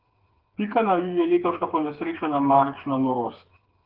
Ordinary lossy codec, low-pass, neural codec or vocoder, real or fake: Opus, 16 kbps; 5.4 kHz; codec, 16 kHz, 4 kbps, FreqCodec, smaller model; fake